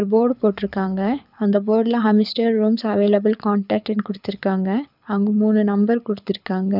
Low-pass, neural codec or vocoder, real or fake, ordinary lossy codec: 5.4 kHz; codec, 24 kHz, 6 kbps, HILCodec; fake; none